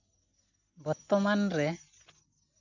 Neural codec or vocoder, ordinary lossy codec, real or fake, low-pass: none; none; real; 7.2 kHz